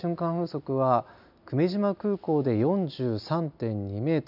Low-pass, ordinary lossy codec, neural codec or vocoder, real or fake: 5.4 kHz; none; none; real